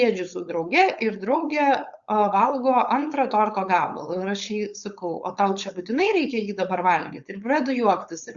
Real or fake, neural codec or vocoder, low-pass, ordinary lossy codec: fake; codec, 16 kHz, 4.8 kbps, FACodec; 7.2 kHz; Opus, 64 kbps